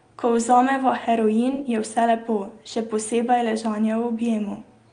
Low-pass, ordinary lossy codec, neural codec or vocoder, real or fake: 9.9 kHz; Opus, 32 kbps; none; real